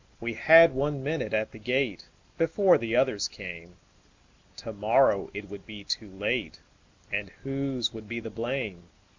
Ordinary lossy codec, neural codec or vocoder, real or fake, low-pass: MP3, 64 kbps; none; real; 7.2 kHz